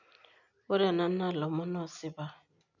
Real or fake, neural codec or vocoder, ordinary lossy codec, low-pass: real; none; none; 7.2 kHz